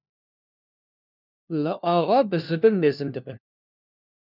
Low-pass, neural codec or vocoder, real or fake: 5.4 kHz; codec, 16 kHz, 1 kbps, FunCodec, trained on LibriTTS, 50 frames a second; fake